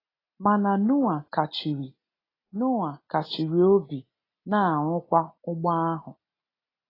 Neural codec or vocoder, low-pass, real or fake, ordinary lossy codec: none; 5.4 kHz; real; AAC, 24 kbps